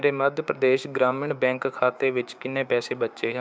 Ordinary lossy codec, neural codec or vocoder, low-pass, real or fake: none; codec, 16 kHz, 6 kbps, DAC; none; fake